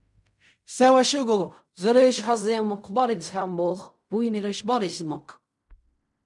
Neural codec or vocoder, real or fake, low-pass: codec, 16 kHz in and 24 kHz out, 0.4 kbps, LongCat-Audio-Codec, fine tuned four codebook decoder; fake; 10.8 kHz